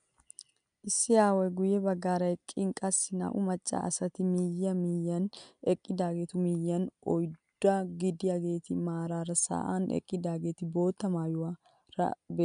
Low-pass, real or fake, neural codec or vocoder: 9.9 kHz; real; none